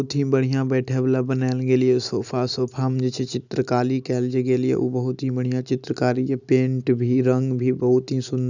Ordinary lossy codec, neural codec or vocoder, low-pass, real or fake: none; none; 7.2 kHz; real